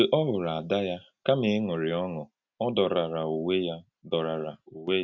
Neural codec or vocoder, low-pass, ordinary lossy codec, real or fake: none; 7.2 kHz; none; real